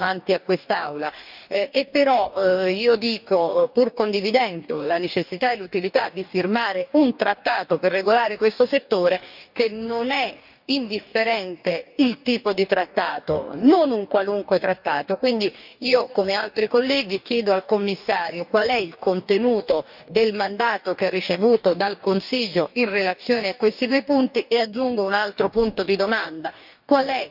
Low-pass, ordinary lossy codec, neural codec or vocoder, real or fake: 5.4 kHz; none; codec, 44.1 kHz, 2.6 kbps, DAC; fake